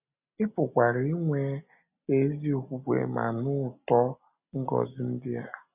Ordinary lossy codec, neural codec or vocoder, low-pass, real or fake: none; none; 3.6 kHz; real